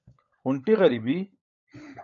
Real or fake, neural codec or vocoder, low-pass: fake; codec, 16 kHz, 16 kbps, FunCodec, trained on LibriTTS, 50 frames a second; 7.2 kHz